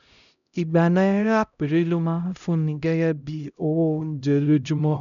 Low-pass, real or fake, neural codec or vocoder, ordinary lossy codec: 7.2 kHz; fake; codec, 16 kHz, 0.5 kbps, X-Codec, HuBERT features, trained on LibriSpeech; none